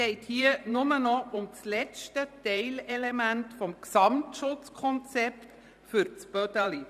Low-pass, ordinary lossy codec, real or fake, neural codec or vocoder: 14.4 kHz; none; fake; vocoder, 44.1 kHz, 128 mel bands every 512 samples, BigVGAN v2